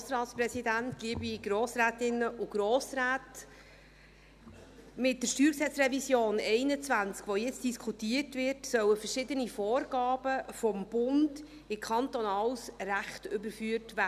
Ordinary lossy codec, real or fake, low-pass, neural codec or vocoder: none; real; 14.4 kHz; none